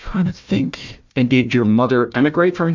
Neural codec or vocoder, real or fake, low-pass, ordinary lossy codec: codec, 16 kHz, 1 kbps, FunCodec, trained on Chinese and English, 50 frames a second; fake; 7.2 kHz; MP3, 64 kbps